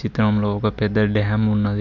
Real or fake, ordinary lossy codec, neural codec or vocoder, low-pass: real; MP3, 64 kbps; none; 7.2 kHz